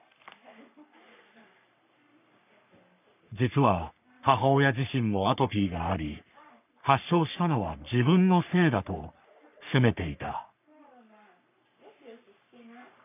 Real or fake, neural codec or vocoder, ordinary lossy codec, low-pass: fake; codec, 44.1 kHz, 3.4 kbps, Pupu-Codec; none; 3.6 kHz